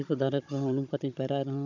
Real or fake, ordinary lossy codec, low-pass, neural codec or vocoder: fake; none; 7.2 kHz; codec, 16 kHz, 16 kbps, FunCodec, trained on Chinese and English, 50 frames a second